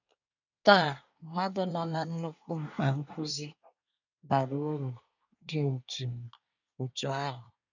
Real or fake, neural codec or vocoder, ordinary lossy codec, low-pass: fake; codec, 24 kHz, 1 kbps, SNAC; none; 7.2 kHz